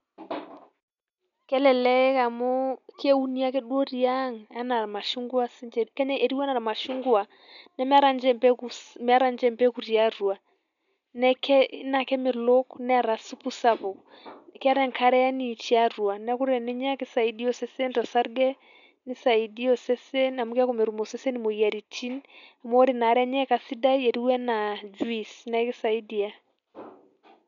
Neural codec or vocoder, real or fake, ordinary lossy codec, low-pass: none; real; none; 7.2 kHz